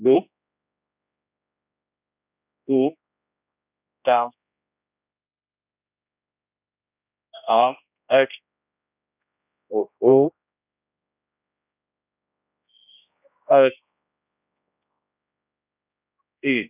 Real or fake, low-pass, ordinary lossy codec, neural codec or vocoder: fake; 3.6 kHz; none; codec, 16 kHz, 1 kbps, X-Codec, HuBERT features, trained on general audio